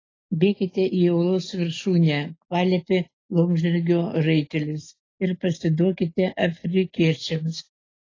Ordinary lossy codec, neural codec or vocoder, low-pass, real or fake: AAC, 32 kbps; none; 7.2 kHz; real